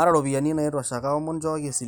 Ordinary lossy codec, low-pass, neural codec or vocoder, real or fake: none; none; none; real